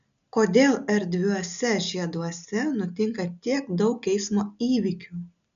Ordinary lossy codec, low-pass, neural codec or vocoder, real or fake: AAC, 96 kbps; 7.2 kHz; none; real